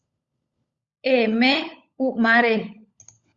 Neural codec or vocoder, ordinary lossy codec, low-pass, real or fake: codec, 16 kHz, 16 kbps, FunCodec, trained on LibriTTS, 50 frames a second; Opus, 64 kbps; 7.2 kHz; fake